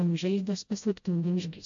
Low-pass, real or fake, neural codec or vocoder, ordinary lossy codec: 7.2 kHz; fake; codec, 16 kHz, 0.5 kbps, FreqCodec, smaller model; AAC, 64 kbps